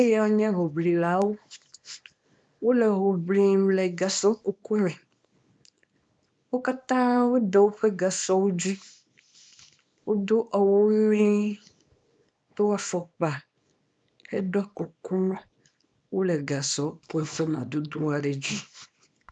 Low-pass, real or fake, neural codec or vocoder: 9.9 kHz; fake; codec, 24 kHz, 0.9 kbps, WavTokenizer, small release